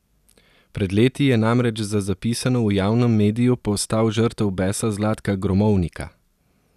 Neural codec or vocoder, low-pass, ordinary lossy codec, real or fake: none; 14.4 kHz; none; real